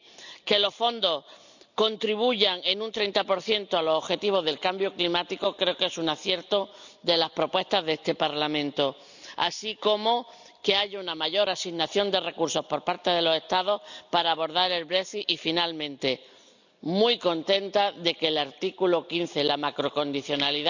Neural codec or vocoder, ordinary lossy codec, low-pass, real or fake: none; none; 7.2 kHz; real